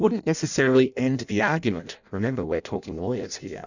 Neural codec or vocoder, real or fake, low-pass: codec, 16 kHz in and 24 kHz out, 0.6 kbps, FireRedTTS-2 codec; fake; 7.2 kHz